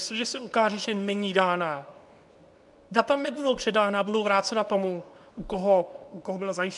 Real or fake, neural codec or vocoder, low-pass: fake; codec, 24 kHz, 0.9 kbps, WavTokenizer, medium speech release version 2; 10.8 kHz